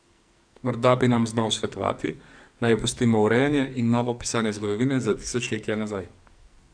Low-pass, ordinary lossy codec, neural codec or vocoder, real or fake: 9.9 kHz; none; codec, 44.1 kHz, 2.6 kbps, SNAC; fake